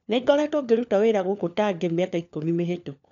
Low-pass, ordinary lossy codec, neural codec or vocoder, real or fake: 7.2 kHz; none; codec, 16 kHz, 4 kbps, FunCodec, trained on LibriTTS, 50 frames a second; fake